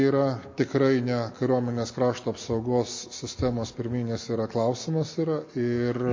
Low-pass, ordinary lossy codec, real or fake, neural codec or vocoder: 7.2 kHz; MP3, 32 kbps; real; none